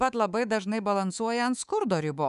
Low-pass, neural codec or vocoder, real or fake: 10.8 kHz; codec, 24 kHz, 3.1 kbps, DualCodec; fake